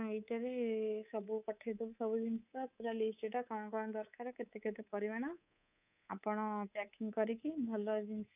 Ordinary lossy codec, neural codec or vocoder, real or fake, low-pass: none; codec, 24 kHz, 3.1 kbps, DualCodec; fake; 3.6 kHz